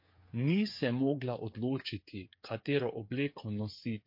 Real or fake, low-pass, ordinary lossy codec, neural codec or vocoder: fake; 5.4 kHz; MP3, 32 kbps; codec, 16 kHz, 8 kbps, FreqCodec, smaller model